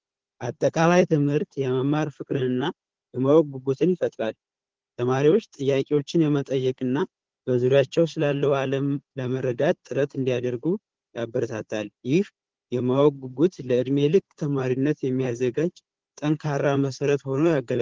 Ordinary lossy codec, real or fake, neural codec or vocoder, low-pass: Opus, 16 kbps; fake; codec, 16 kHz, 4 kbps, FunCodec, trained on Chinese and English, 50 frames a second; 7.2 kHz